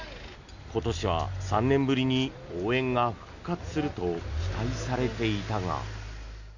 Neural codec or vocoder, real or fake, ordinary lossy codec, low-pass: none; real; none; 7.2 kHz